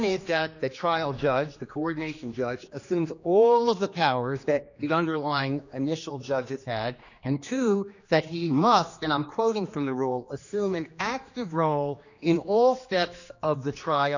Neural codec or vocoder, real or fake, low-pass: codec, 16 kHz, 2 kbps, X-Codec, HuBERT features, trained on general audio; fake; 7.2 kHz